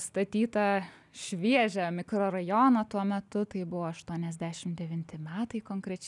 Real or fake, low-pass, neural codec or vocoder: real; 10.8 kHz; none